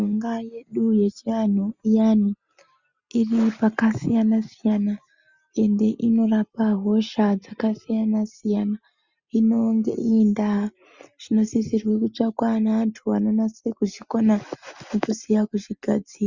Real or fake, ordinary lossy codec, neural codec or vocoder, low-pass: real; Opus, 64 kbps; none; 7.2 kHz